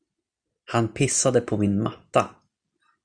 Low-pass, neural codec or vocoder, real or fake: 9.9 kHz; none; real